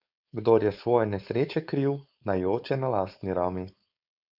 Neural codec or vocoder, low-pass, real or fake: codec, 16 kHz, 4.8 kbps, FACodec; 5.4 kHz; fake